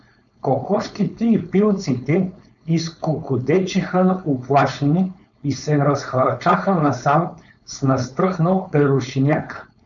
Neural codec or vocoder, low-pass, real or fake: codec, 16 kHz, 4.8 kbps, FACodec; 7.2 kHz; fake